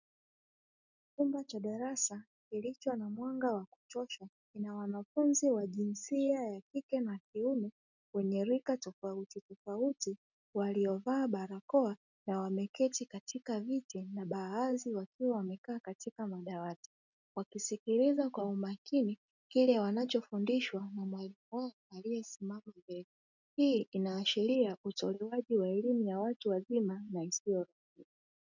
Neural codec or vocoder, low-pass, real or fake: none; 7.2 kHz; real